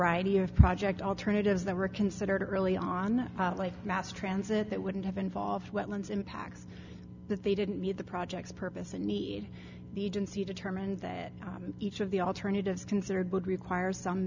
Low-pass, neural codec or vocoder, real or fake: 7.2 kHz; none; real